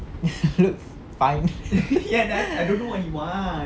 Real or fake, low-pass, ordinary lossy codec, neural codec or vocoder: real; none; none; none